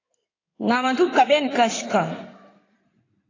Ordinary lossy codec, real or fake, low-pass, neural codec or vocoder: AAC, 32 kbps; fake; 7.2 kHz; codec, 16 kHz in and 24 kHz out, 2.2 kbps, FireRedTTS-2 codec